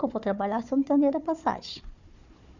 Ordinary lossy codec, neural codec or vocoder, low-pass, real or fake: none; codec, 16 kHz, 4 kbps, FunCodec, trained on Chinese and English, 50 frames a second; 7.2 kHz; fake